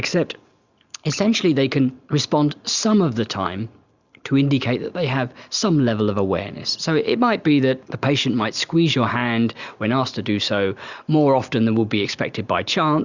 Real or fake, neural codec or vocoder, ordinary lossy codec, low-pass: real; none; Opus, 64 kbps; 7.2 kHz